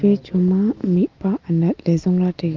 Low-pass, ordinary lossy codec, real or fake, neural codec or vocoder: 7.2 kHz; Opus, 24 kbps; real; none